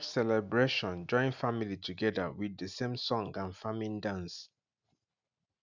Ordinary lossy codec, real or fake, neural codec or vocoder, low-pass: none; real; none; 7.2 kHz